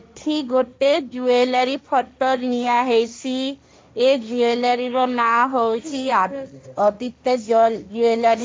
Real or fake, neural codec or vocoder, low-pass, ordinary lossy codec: fake; codec, 16 kHz, 1.1 kbps, Voila-Tokenizer; none; none